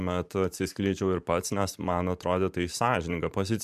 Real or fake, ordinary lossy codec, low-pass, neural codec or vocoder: fake; MP3, 96 kbps; 14.4 kHz; vocoder, 44.1 kHz, 128 mel bands every 512 samples, BigVGAN v2